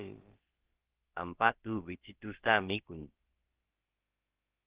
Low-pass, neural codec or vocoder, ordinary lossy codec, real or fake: 3.6 kHz; codec, 16 kHz, about 1 kbps, DyCAST, with the encoder's durations; Opus, 16 kbps; fake